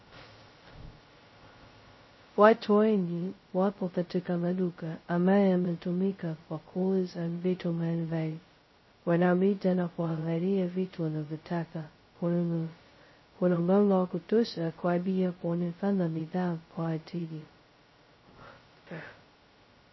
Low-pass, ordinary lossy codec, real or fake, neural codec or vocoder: 7.2 kHz; MP3, 24 kbps; fake; codec, 16 kHz, 0.2 kbps, FocalCodec